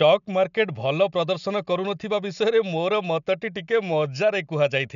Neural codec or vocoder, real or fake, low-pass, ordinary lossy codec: none; real; 7.2 kHz; none